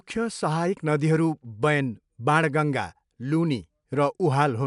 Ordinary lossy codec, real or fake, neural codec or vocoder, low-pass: MP3, 96 kbps; real; none; 10.8 kHz